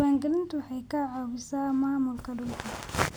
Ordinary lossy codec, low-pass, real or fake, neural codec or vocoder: none; none; real; none